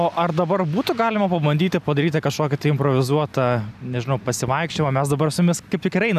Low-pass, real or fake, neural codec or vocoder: 14.4 kHz; real; none